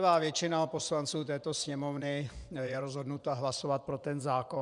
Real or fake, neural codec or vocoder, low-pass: fake; vocoder, 24 kHz, 100 mel bands, Vocos; 10.8 kHz